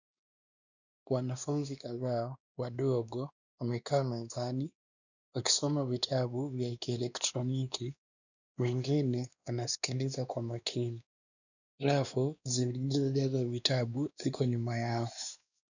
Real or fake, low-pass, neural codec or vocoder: fake; 7.2 kHz; codec, 16 kHz, 2 kbps, X-Codec, WavLM features, trained on Multilingual LibriSpeech